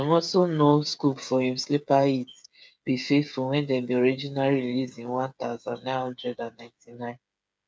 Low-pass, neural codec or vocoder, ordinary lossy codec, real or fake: none; codec, 16 kHz, 8 kbps, FreqCodec, smaller model; none; fake